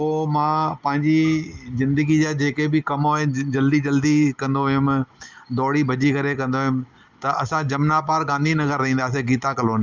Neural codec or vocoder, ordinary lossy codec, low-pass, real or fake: none; Opus, 32 kbps; 7.2 kHz; real